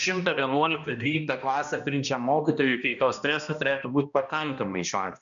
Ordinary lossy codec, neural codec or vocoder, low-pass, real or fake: MP3, 96 kbps; codec, 16 kHz, 1 kbps, X-Codec, HuBERT features, trained on balanced general audio; 7.2 kHz; fake